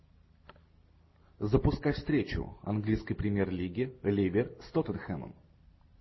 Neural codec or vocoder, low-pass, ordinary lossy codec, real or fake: none; 7.2 kHz; MP3, 24 kbps; real